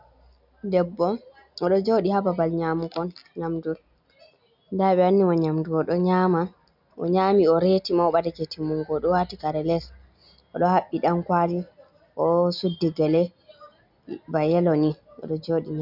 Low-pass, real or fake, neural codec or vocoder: 5.4 kHz; real; none